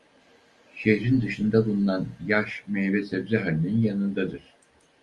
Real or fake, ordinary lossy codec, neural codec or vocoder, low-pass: real; Opus, 32 kbps; none; 10.8 kHz